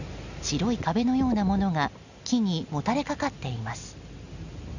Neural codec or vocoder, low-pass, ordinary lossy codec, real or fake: none; 7.2 kHz; none; real